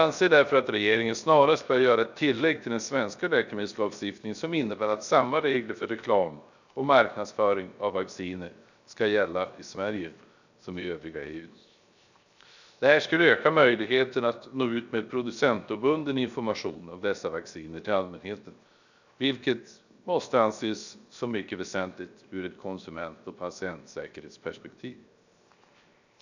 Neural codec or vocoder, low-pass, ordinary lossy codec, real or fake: codec, 16 kHz, 0.7 kbps, FocalCodec; 7.2 kHz; none; fake